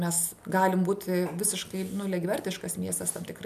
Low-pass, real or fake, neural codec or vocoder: 14.4 kHz; real; none